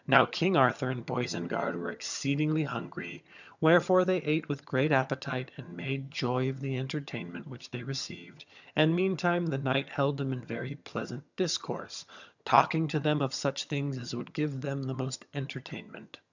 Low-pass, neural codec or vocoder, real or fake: 7.2 kHz; vocoder, 22.05 kHz, 80 mel bands, HiFi-GAN; fake